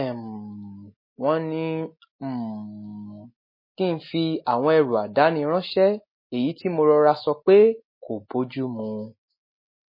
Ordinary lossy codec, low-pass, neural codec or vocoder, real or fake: MP3, 24 kbps; 5.4 kHz; none; real